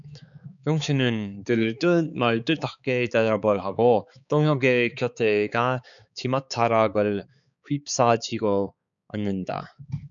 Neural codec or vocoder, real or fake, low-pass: codec, 16 kHz, 4 kbps, X-Codec, HuBERT features, trained on balanced general audio; fake; 7.2 kHz